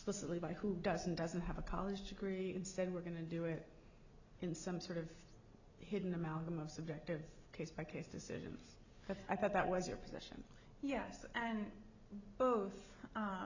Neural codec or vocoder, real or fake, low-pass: none; real; 7.2 kHz